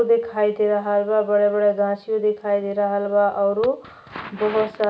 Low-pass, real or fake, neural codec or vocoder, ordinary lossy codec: none; real; none; none